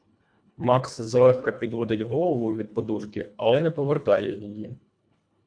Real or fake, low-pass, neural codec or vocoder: fake; 9.9 kHz; codec, 24 kHz, 1.5 kbps, HILCodec